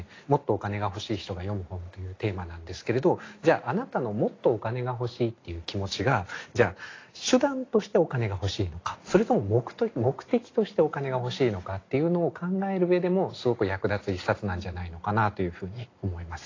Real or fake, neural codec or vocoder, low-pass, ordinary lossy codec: real; none; 7.2 kHz; AAC, 32 kbps